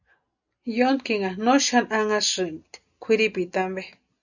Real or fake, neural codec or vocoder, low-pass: real; none; 7.2 kHz